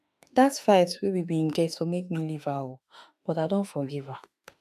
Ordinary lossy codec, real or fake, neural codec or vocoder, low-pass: none; fake; autoencoder, 48 kHz, 32 numbers a frame, DAC-VAE, trained on Japanese speech; 14.4 kHz